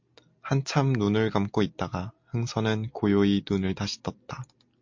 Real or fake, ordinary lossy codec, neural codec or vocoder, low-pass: real; MP3, 48 kbps; none; 7.2 kHz